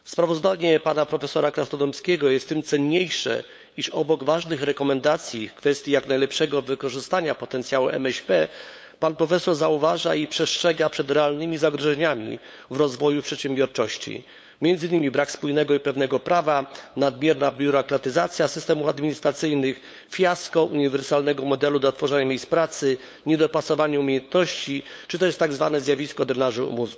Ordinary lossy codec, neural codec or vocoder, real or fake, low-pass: none; codec, 16 kHz, 8 kbps, FunCodec, trained on LibriTTS, 25 frames a second; fake; none